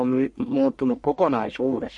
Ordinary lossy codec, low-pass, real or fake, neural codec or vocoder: MP3, 48 kbps; 10.8 kHz; fake; codec, 44.1 kHz, 1.7 kbps, Pupu-Codec